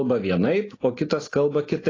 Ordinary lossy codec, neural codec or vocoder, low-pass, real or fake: AAC, 32 kbps; none; 7.2 kHz; real